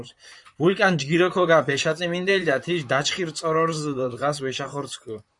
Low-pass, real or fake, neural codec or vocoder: 10.8 kHz; fake; vocoder, 44.1 kHz, 128 mel bands, Pupu-Vocoder